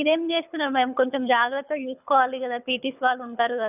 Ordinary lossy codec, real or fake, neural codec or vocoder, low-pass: none; fake; codec, 24 kHz, 6 kbps, HILCodec; 3.6 kHz